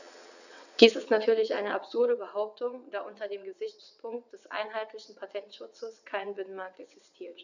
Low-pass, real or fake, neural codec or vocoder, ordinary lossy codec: 7.2 kHz; fake; vocoder, 22.05 kHz, 80 mel bands, WaveNeXt; none